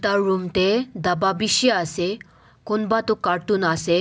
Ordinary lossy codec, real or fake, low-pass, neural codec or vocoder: none; real; none; none